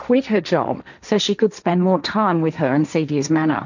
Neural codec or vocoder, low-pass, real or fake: codec, 16 kHz, 1.1 kbps, Voila-Tokenizer; 7.2 kHz; fake